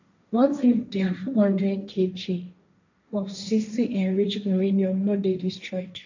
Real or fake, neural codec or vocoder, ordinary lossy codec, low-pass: fake; codec, 16 kHz, 1.1 kbps, Voila-Tokenizer; none; 7.2 kHz